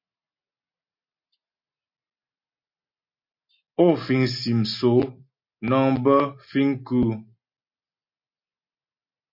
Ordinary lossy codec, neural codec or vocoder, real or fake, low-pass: MP3, 32 kbps; none; real; 5.4 kHz